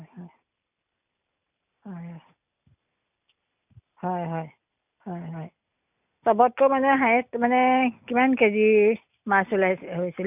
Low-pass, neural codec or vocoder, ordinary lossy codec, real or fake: 3.6 kHz; none; none; real